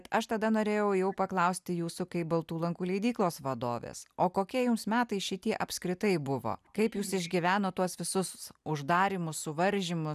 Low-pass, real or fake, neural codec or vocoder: 14.4 kHz; real; none